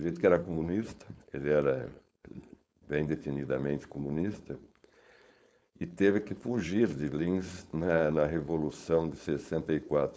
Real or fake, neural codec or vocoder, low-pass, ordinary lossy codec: fake; codec, 16 kHz, 4.8 kbps, FACodec; none; none